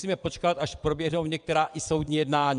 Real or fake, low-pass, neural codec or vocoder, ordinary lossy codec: fake; 9.9 kHz; vocoder, 22.05 kHz, 80 mel bands, WaveNeXt; AAC, 96 kbps